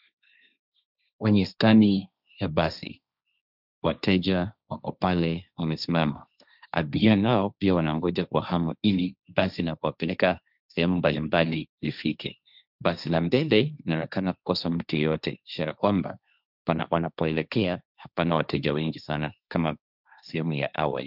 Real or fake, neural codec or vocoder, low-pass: fake; codec, 16 kHz, 1.1 kbps, Voila-Tokenizer; 5.4 kHz